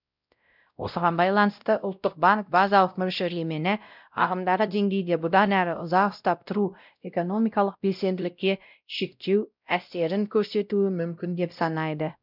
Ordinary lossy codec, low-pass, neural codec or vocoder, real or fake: none; 5.4 kHz; codec, 16 kHz, 0.5 kbps, X-Codec, WavLM features, trained on Multilingual LibriSpeech; fake